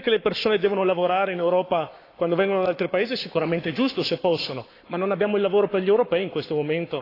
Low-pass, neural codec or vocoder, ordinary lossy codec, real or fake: 5.4 kHz; codec, 44.1 kHz, 7.8 kbps, Pupu-Codec; AAC, 32 kbps; fake